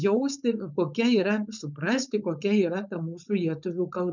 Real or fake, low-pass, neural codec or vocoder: fake; 7.2 kHz; codec, 16 kHz, 4.8 kbps, FACodec